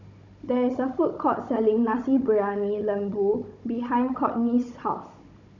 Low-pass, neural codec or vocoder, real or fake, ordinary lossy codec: 7.2 kHz; codec, 16 kHz, 16 kbps, FunCodec, trained on Chinese and English, 50 frames a second; fake; none